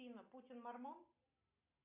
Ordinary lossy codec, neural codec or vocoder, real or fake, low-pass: MP3, 32 kbps; none; real; 3.6 kHz